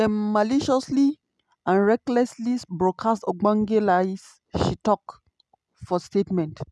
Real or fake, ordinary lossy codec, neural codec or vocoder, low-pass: real; none; none; none